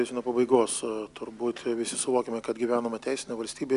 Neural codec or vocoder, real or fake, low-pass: none; real; 10.8 kHz